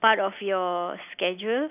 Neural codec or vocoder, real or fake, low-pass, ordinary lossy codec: none; real; 3.6 kHz; none